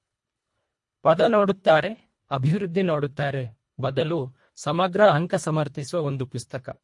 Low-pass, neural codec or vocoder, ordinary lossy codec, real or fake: 10.8 kHz; codec, 24 kHz, 1.5 kbps, HILCodec; MP3, 48 kbps; fake